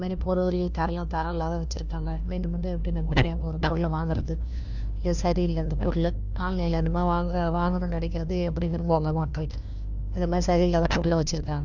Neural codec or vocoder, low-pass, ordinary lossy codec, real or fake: codec, 16 kHz, 1 kbps, FunCodec, trained on LibriTTS, 50 frames a second; 7.2 kHz; none; fake